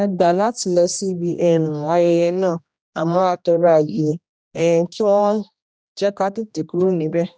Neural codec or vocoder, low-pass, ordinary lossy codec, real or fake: codec, 16 kHz, 1 kbps, X-Codec, HuBERT features, trained on general audio; none; none; fake